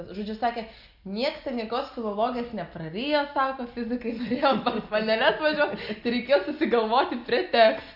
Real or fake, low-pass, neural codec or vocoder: real; 5.4 kHz; none